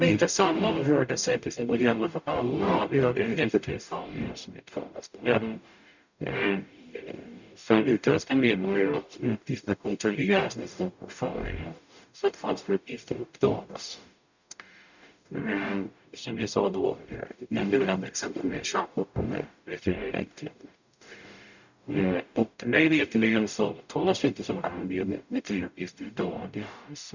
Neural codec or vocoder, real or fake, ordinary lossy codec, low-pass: codec, 44.1 kHz, 0.9 kbps, DAC; fake; none; 7.2 kHz